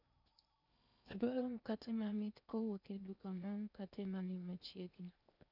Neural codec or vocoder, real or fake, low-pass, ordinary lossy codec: codec, 16 kHz in and 24 kHz out, 0.8 kbps, FocalCodec, streaming, 65536 codes; fake; 5.4 kHz; none